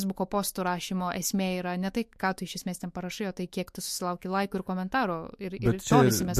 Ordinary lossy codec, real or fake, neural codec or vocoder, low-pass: MP3, 64 kbps; fake; autoencoder, 48 kHz, 128 numbers a frame, DAC-VAE, trained on Japanese speech; 14.4 kHz